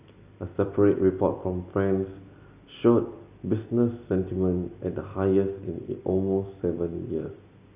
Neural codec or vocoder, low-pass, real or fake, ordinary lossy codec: none; 3.6 kHz; real; Opus, 64 kbps